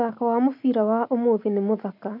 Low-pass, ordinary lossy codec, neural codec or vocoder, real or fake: 5.4 kHz; none; none; real